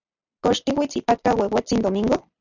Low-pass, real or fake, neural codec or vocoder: 7.2 kHz; real; none